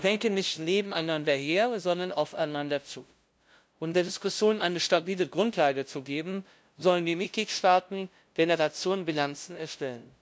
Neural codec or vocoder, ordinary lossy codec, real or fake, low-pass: codec, 16 kHz, 0.5 kbps, FunCodec, trained on LibriTTS, 25 frames a second; none; fake; none